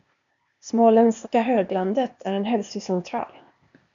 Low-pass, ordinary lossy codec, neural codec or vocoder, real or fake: 7.2 kHz; MP3, 64 kbps; codec, 16 kHz, 0.8 kbps, ZipCodec; fake